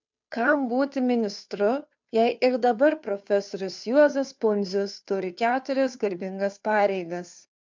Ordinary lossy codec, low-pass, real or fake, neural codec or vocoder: MP3, 64 kbps; 7.2 kHz; fake; codec, 16 kHz, 2 kbps, FunCodec, trained on Chinese and English, 25 frames a second